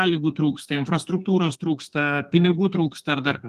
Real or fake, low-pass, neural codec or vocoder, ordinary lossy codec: fake; 14.4 kHz; codec, 32 kHz, 1.9 kbps, SNAC; Opus, 24 kbps